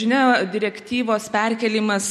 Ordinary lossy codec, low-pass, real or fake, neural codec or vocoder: MP3, 64 kbps; 19.8 kHz; real; none